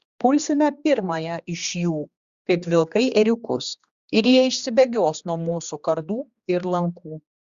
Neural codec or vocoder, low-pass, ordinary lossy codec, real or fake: codec, 16 kHz, 2 kbps, X-Codec, HuBERT features, trained on general audio; 7.2 kHz; Opus, 64 kbps; fake